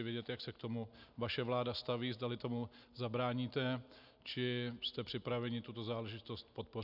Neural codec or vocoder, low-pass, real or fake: none; 5.4 kHz; real